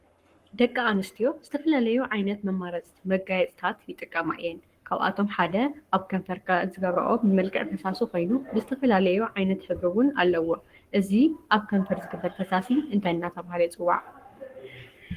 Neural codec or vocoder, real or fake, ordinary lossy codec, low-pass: codec, 44.1 kHz, 7.8 kbps, Pupu-Codec; fake; Opus, 24 kbps; 14.4 kHz